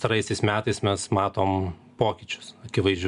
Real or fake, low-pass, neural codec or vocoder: real; 10.8 kHz; none